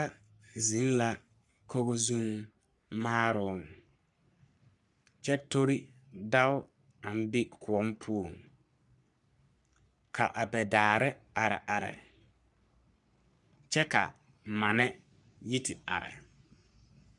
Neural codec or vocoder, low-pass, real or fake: codec, 44.1 kHz, 3.4 kbps, Pupu-Codec; 10.8 kHz; fake